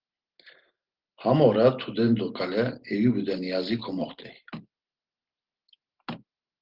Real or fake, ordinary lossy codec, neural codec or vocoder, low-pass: real; Opus, 16 kbps; none; 5.4 kHz